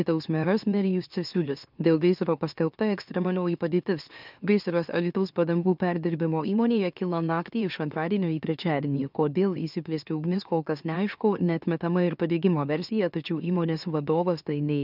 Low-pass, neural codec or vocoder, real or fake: 5.4 kHz; autoencoder, 44.1 kHz, a latent of 192 numbers a frame, MeloTTS; fake